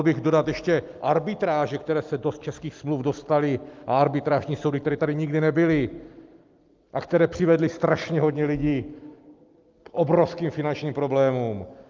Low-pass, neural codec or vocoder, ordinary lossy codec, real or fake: 7.2 kHz; none; Opus, 32 kbps; real